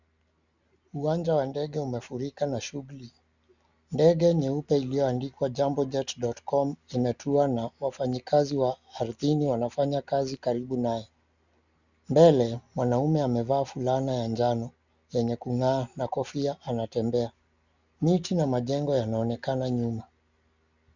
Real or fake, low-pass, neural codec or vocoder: real; 7.2 kHz; none